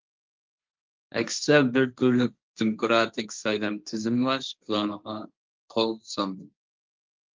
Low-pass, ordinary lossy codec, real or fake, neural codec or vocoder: 7.2 kHz; Opus, 32 kbps; fake; codec, 16 kHz, 1.1 kbps, Voila-Tokenizer